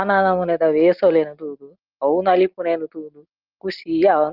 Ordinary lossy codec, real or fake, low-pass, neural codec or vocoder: Opus, 24 kbps; real; 5.4 kHz; none